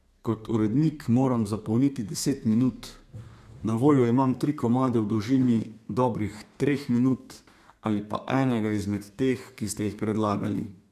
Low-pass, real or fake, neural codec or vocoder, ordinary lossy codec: 14.4 kHz; fake; codec, 32 kHz, 1.9 kbps, SNAC; MP3, 96 kbps